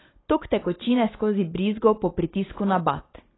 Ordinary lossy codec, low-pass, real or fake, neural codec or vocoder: AAC, 16 kbps; 7.2 kHz; real; none